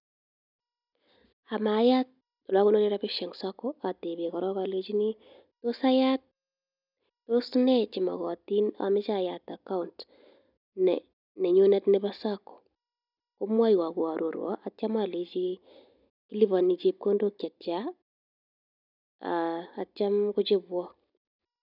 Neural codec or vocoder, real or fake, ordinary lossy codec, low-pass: none; real; none; 5.4 kHz